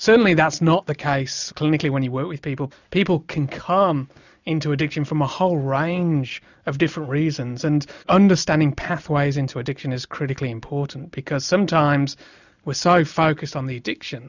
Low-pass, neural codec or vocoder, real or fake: 7.2 kHz; none; real